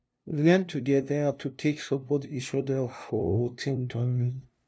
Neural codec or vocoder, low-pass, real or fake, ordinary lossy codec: codec, 16 kHz, 0.5 kbps, FunCodec, trained on LibriTTS, 25 frames a second; none; fake; none